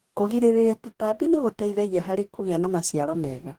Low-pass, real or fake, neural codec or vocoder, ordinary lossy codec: 19.8 kHz; fake; codec, 44.1 kHz, 2.6 kbps, DAC; Opus, 24 kbps